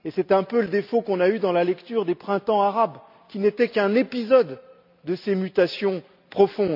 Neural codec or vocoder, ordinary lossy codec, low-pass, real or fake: none; none; 5.4 kHz; real